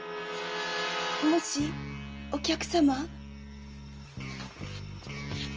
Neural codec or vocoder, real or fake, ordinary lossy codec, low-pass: none; real; Opus, 24 kbps; 7.2 kHz